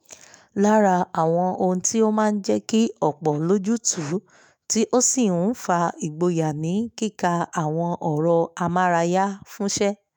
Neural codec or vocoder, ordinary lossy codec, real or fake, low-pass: autoencoder, 48 kHz, 128 numbers a frame, DAC-VAE, trained on Japanese speech; none; fake; none